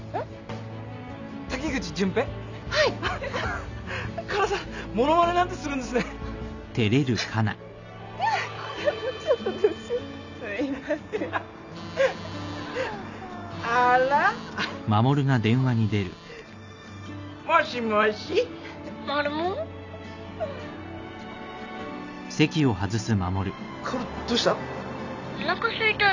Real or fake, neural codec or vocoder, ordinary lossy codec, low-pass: real; none; none; 7.2 kHz